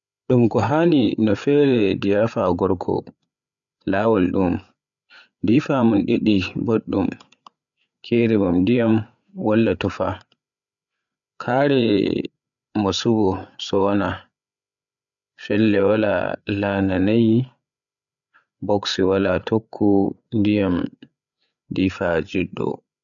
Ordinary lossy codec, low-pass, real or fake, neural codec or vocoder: none; 7.2 kHz; fake; codec, 16 kHz, 8 kbps, FreqCodec, larger model